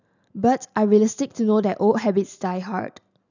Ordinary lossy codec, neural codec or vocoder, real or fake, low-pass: none; none; real; 7.2 kHz